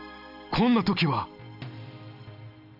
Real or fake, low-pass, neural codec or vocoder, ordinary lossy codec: real; 5.4 kHz; none; none